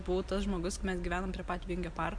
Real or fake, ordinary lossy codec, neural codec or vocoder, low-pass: real; AAC, 64 kbps; none; 9.9 kHz